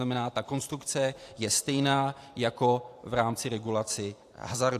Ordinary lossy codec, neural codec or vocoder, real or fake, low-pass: AAC, 64 kbps; vocoder, 44.1 kHz, 128 mel bands every 512 samples, BigVGAN v2; fake; 14.4 kHz